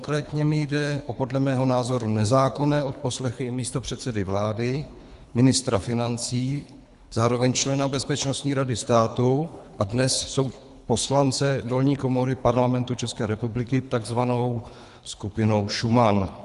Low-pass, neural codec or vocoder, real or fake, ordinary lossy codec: 10.8 kHz; codec, 24 kHz, 3 kbps, HILCodec; fake; Opus, 64 kbps